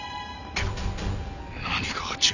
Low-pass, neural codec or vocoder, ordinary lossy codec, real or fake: 7.2 kHz; none; none; real